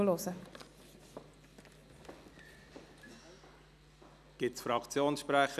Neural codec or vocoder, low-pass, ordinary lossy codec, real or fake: none; 14.4 kHz; none; real